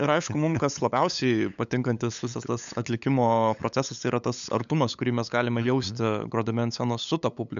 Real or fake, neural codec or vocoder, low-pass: fake; codec, 16 kHz, 8 kbps, FunCodec, trained on LibriTTS, 25 frames a second; 7.2 kHz